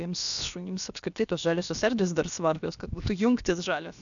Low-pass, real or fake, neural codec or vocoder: 7.2 kHz; fake; codec, 16 kHz, about 1 kbps, DyCAST, with the encoder's durations